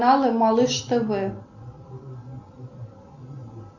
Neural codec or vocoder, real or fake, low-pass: none; real; 7.2 kHz